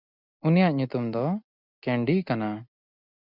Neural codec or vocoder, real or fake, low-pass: none; real; 5.4 kHz